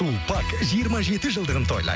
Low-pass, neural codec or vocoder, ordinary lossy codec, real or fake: none; none; none; real